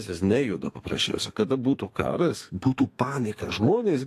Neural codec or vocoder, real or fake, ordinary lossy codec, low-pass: codec, 32 kHz, 1.9 kbps, SNAC; fake; AAC, 64 kbps; 14.4 kHz